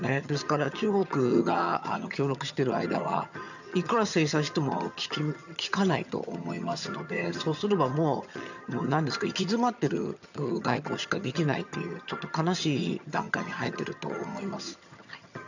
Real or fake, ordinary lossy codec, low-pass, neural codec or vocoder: fake; none; 7.2 kHz; vocoder, 22.05 kHz, 80 mel bands, HiFi-GAN